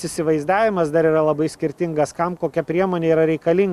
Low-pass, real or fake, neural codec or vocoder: 14.4 kHz; real; none